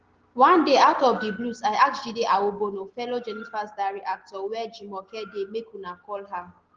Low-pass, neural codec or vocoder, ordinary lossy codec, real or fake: 7.2 kHz; none; Opus, 16 kbps; real